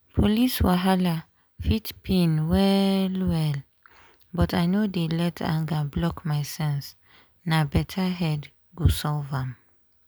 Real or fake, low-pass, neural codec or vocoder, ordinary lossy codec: real; none; none; none